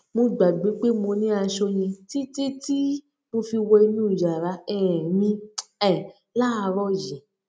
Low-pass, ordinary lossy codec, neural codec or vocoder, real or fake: none; none; none; real